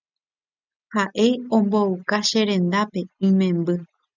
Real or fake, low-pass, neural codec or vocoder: real; 7.2 kHz; none